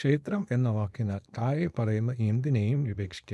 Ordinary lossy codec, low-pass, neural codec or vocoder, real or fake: none; none; codec, 24 kHz, 0.9 kbps, WavTokenizer, small release; fake